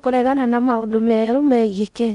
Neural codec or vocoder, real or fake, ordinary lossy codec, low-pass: codec, 16 kHz in and 24 kHz out, 0.6 kbps, FocalCodec, streaming, 4096 codes; fake; none; 10.8 kHz